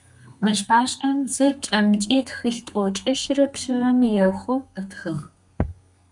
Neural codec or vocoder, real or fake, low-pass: codec, 32 kHz, 1.9 kbps, SNAC; fake; 10.8 kHz